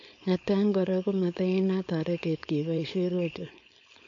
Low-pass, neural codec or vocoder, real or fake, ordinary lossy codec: 7.2 kHz; codec, 16 kHz, 4.8 kbps, FACodec; fake; MP3, 48 kbps